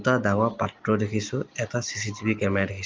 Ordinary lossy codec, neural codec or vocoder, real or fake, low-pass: Opus, 32 kbps; none; real; 7.2 kHz